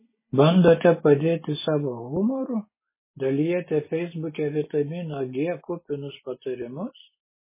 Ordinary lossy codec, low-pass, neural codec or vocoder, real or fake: MP3, 16 kbps; 3.6 kHz; none; real